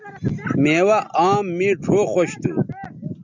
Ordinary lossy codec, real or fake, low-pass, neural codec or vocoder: MP3, 64 kbps; real; 7.2 kHz; none